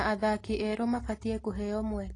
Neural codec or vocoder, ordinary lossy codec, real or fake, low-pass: none; AAC, 32 kbps; real; 10.8 kHz